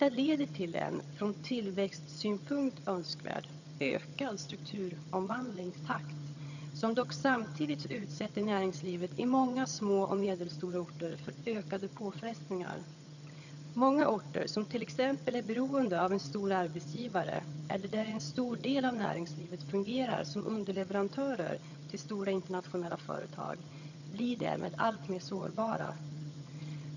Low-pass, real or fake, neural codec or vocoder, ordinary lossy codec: 7.2 kHz; fake; vocoder, 22.05 kHz, 80 mel bands, HiFi-GAN; none